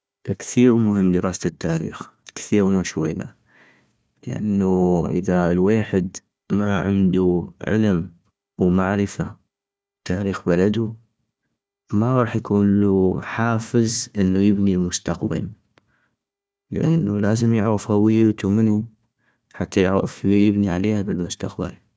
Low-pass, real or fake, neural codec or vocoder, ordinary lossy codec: none; fake; codec, 16 kHz, 1 kbps, FunCodec, trained on Chinese and English, 50 frames a second; none